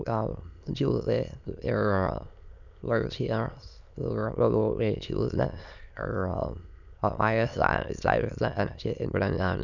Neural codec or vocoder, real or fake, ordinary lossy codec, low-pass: autoencoder, 22.05 kHz, a latent of 192 numbers a frame, VITS, trained on many speakers; fake; none; 7.2 kHz